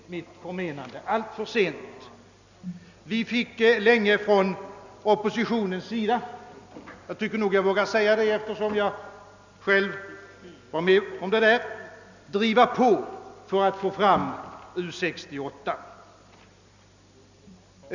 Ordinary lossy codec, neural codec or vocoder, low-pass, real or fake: none; none; 7.2 kHz; real